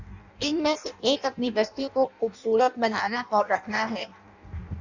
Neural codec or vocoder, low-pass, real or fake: codec, 16 kHz in and 24 kHz out, 0.6 kbps, FireRedTTS-2 codec; 7.2 kHz; fake